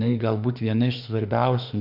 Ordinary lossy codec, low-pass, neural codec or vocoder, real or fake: AAC, 48 kbps; 5.4 kHz; autoencoder, 48 kHz, 32 numbers a frame, DAC-VAE, trained on Japanese speech; fake